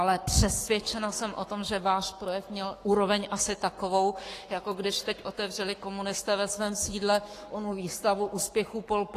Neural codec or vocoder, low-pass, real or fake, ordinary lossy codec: codec, 44.1 kHz, 7.8 kbps, Pupu-Codec; 14.4 kHz; fake; AAC, 48 kbps